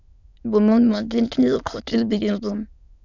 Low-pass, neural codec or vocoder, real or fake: 7.2 kHz; autoencoder, 22.05 kHz, a latent of 192 numbers a frame, VITS, trained on many speakers; fake